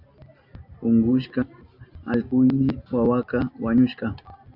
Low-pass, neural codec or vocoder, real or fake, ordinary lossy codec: 5.4 kHz; none; real; AAC, 48 kbps